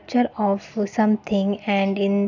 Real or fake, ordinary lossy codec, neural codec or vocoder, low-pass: real; none; none; 7.2 kHz